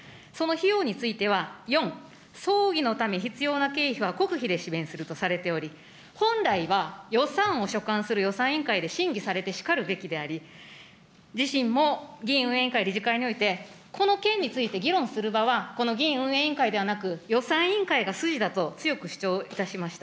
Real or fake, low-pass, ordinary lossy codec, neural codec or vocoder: real; none; none; none